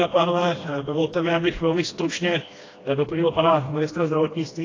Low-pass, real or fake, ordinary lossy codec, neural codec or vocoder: 7.2 kHz; fake; AAC, 32 kbps; codec, 16 kHz, 1 kbps, FreqCodec, smaller model